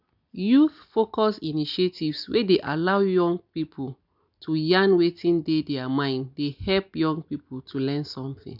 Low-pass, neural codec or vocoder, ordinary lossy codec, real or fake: 5.4 kHz; none; none; real